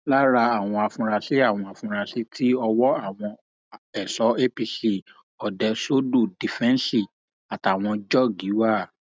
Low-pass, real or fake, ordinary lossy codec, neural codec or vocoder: none; real; none; none